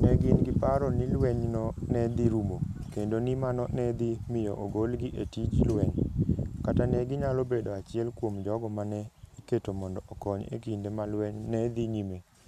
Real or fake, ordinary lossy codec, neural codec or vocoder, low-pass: real; none; none; 14.4 kHz